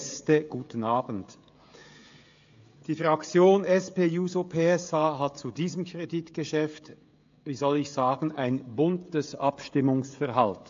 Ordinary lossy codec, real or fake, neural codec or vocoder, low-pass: AAC, 48 kbps; fake; codec, 16 kHz, 16 kbps, FreqCodec, smaller model; 7.2 kHz